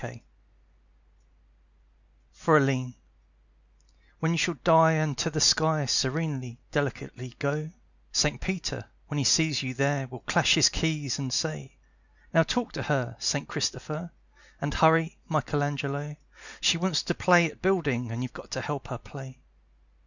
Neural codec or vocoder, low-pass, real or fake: none; 7.2 kHz; real